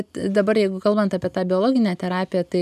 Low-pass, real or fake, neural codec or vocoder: 14.4 kHz; real; none